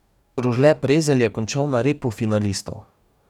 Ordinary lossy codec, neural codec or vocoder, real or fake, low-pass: none; codec, 44.1 kHz, 2.6 kbps, DAC; fake; 19.8 kHz